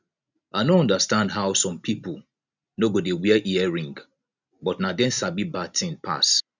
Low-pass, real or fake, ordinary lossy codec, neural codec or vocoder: 7.2 kHz; real; none; none